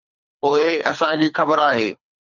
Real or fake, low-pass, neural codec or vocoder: fake; 7.2 kHz; codec, 24 kHz, 3 kbps, HILCodec